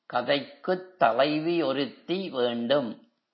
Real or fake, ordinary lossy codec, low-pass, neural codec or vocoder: real; MP3, 24 kbps; 7.2 kHz; none